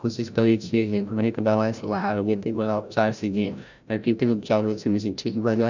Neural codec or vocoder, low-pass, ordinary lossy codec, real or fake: codec, 16 kHz, 0.5 kbps, FreqCodec, larger model; 7.2 kHz; none; fake